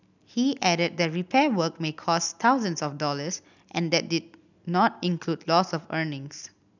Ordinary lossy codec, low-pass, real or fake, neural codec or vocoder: none; 7.2 kHz; real; none